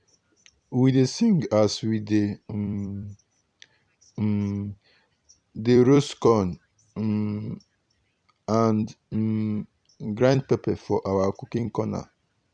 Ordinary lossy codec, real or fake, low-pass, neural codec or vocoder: none; fake; 9.9 kHz; vocoder, 44.1 kHz, 128 mel bands every 256 samples, BigVGAN v2